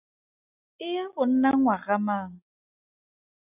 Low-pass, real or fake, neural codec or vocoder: 3.6 kHz; real; none